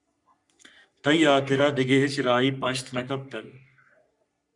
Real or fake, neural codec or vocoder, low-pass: fake; codec, 44.1 kHz, 3.4 kbps, Pupu-Codec; 10.8 kHz